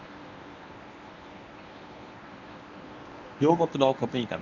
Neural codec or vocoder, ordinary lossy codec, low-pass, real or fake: codec, 24 kHz, 0.9 kbps, WavTokenizer, medium speech release version 1; none; 7.2 kHz; fake